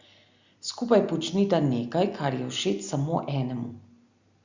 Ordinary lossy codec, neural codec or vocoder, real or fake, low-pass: Opus, 64 kbps; none; real; 7.2 kHz